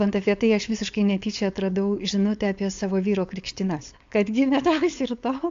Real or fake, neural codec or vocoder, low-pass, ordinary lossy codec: fake; codec, 16 kHz, 4.8 kbps, FACodec; 7.2 kHz; AAC, 64 kbps